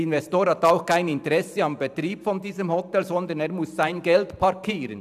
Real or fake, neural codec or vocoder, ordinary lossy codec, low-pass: fake; vocoder, 44.1 kHz, 128 mel bands every 512 samples, BigVGAN v2; none; 14.4 kHz